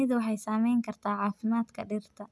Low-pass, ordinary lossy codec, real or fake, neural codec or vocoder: none; none; real; none